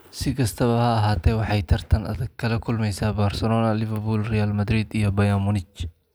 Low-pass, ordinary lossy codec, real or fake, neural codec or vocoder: none; none; real; none